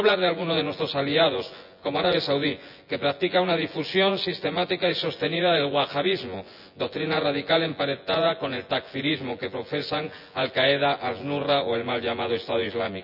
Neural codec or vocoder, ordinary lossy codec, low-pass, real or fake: vocoder, 24 kHz, 100 mel bands, Vocos; none; 5.4 kHz; fake